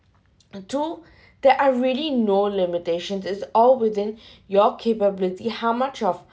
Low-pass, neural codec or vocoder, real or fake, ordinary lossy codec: none; none; real; none